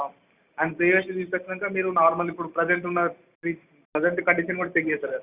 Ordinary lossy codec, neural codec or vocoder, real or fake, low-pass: Opus, 64 kbps; none; real; 3.6 kHz